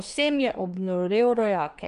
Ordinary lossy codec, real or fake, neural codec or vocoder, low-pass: none; fake; codec, 24 kHz, 1 kbps, SNAC; 10.8 kHz